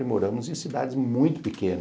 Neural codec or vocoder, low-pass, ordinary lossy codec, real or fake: none; none; none; real